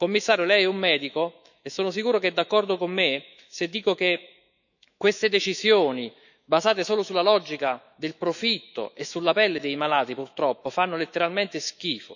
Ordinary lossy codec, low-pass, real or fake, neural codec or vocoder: none; 7.2 kHz; fake; autoencoder, 48 kHz, 128 numbers a frame, DAC-VAE, trained on Japanese speech